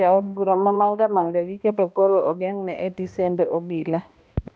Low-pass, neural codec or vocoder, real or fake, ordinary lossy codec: none; codec, 16 kHz, 1 kbps, X-Codec, HuBERT features, trained on balanced general audio; fake; none